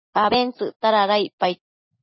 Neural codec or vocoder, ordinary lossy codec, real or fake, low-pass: none; MP3, 24 kbps; real; 7.2 kHz